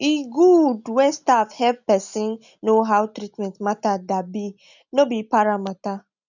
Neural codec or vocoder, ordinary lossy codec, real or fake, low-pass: none; none; real; 7.2 kHz